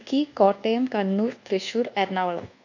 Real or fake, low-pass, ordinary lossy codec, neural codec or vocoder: fake; 7.2 kHz; none; codec, 24 kHz, 1.2 kbps, DualCodec